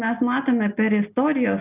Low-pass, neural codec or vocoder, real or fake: 3.6 kHz; none; real